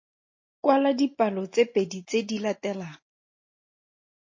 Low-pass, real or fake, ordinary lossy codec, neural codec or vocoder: 7.2 kHz; real; MP3, 32 kbps; none